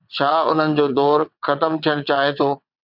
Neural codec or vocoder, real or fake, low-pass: vocoder, 22.05 kHz, 80 mel bands, WaveNeXt; fake; 5.4 kHz